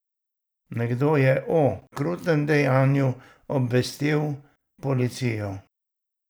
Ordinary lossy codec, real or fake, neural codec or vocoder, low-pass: none; fake; vocoder, 44.1 kHz, 128 mel bands every 512 samples, BigVGAN v2; none